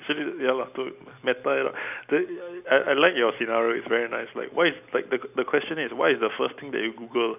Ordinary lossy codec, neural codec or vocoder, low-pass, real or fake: none; none; 3.6 kHz; real